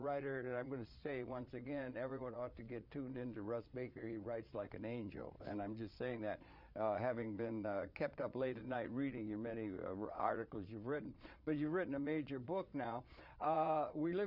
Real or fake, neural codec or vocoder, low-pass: fake; vocoder, 22.05 kHz, 80 mel bands, Vocos; 5.4 kHz